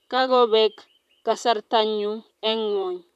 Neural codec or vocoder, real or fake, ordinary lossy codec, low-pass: vocoder, 44.1 kHz, 128 mel bands, Pupu-Vocoder; fake; AAC, 96 kbps; 14.4 kHz